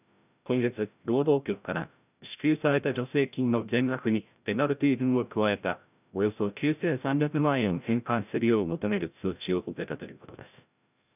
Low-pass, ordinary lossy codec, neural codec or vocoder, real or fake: 3.6 kHz; none; codec, 16 kHz, 0.5 kbps, FreqCodec, larger model; fake